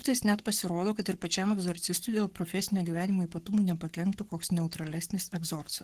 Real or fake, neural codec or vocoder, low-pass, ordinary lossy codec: fake; codec, 44.1 kHz, 7.8 kbps, Pupu-Codec; 14.4 kHz; Opus, 16 kbps